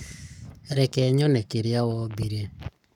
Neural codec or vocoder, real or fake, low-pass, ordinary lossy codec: codec, 44.1 kHz, 7.8 kbps, Pupu-Codec; fake; 19.8 kHz; none